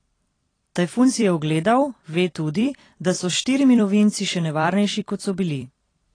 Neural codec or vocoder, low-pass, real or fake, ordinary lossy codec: vocoder, 44.1 kHz, 128 mel bands every 256 samples, BigVGAN v2; 9.9 kHz; fake; AAC, 32 kbps